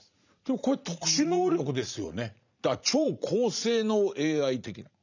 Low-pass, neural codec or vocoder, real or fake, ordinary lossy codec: 7.2 kHz; vocoder, 44.1 kHz, 80 mel bands, Vocos; fake; none